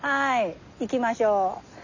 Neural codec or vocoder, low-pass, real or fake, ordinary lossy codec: none; 7.2 kHz; real; none